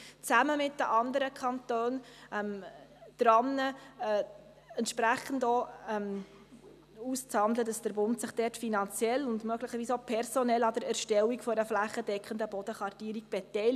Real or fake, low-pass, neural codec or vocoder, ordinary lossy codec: real; 14.4 kHz; none; none